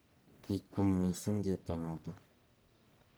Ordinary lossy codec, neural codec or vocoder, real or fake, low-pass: none; codec, 44.1 kHz, 1.7 kbps, Pupu-Codec; fake; none